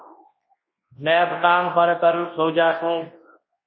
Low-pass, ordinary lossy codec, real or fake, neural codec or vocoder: 7.2 kHz; MP3, 24 kbps; fake; codec, 24 kHz, 0.9 kbps, WavTokenizer, large speech release